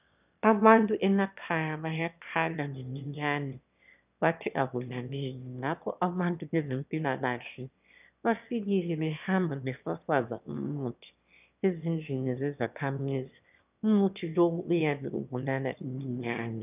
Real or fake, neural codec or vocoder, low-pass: fake; autoencoder, 22.05 kHz, a latent of 192 numbers a frame, VITS, trained on one speaker; 3.6 kHz